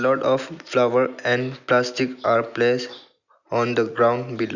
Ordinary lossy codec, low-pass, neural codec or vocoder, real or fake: none; 7.2 kHz; none; real